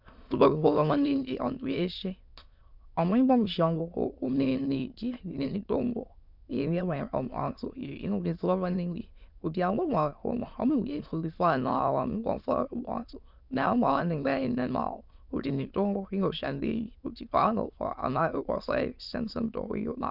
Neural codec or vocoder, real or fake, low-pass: autoencoder, 22.05 kHz, a latent of 192 numbers a frame, VITS, trained on many speakers; fake; 5.4 kHz